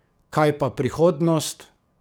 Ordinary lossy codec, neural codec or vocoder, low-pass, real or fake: none; codec, 44.1 kHz, 7.8 kbps, DAC; none; fake